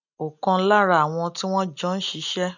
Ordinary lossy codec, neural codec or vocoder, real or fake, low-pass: none; none; real; 7.2 kHz